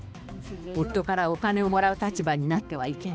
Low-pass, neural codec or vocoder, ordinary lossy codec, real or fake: none; codec, 16 kHz, 2 kbps, X-Codec, HuBERT features, trained on balanced general audio; none; fake